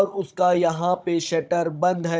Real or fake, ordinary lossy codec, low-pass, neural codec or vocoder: fake; none; none; codec, 16 kHz, 16 kbps, FunCodec, trained on Chinese and English, 50 frames a second